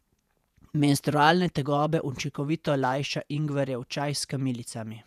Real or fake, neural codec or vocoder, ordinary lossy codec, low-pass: fake; vocoder, 48 kHz, 128 mel bands, Vocos; none; 14.4 kHz